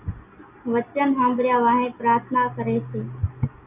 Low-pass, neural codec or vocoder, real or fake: 3.6 kHz; none; real